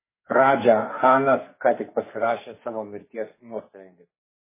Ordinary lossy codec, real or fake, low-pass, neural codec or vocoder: MP3, 16 kbps; fake; 3.6 kHz; codec, 44.1 kHz, 2.6 kbps, SNAC